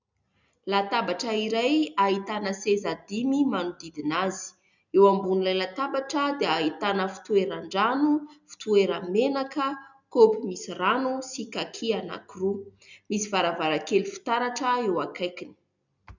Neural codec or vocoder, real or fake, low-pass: none; real; 7.2 kHz